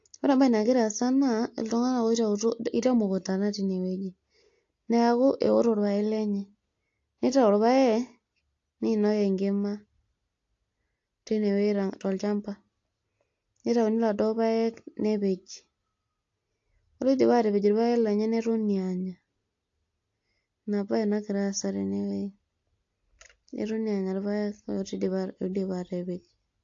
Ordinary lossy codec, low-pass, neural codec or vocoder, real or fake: AAC, 48 kbps; 7.2 kHz; none; real